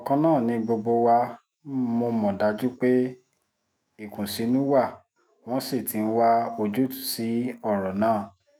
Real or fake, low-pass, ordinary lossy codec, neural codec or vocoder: fake; none; none; autoencoder, 48 kHz, 128 numbers a frame, DAC-VAE, trained on Japanese speech